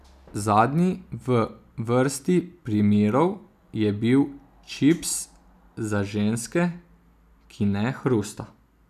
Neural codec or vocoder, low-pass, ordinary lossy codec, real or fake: none; 14.4 kHz; none; real